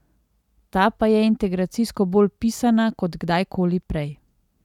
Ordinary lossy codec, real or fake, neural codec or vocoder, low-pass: none; real; none; 19.8 kHz